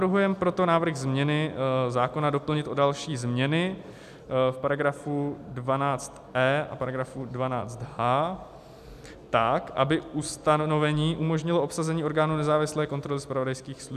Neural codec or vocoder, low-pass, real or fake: none; 14.4 kHz; real